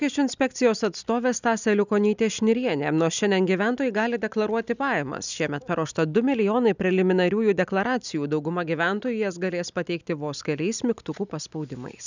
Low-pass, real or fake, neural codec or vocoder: 7.2 kHz; real; none